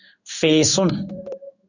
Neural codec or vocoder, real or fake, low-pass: codec, 16 kHz in and 24 kHz out, 1 kbps, XY-Tokenizer; fake; 7.2 kHz